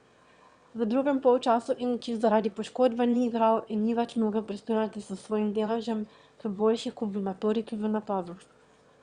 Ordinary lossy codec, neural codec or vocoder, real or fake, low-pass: Opus, 64 kbps; autoencoder, 22.05 kHz, a latent of 192 numbers a frame, VITS, trained on one speaker; fake; 9.9 kHz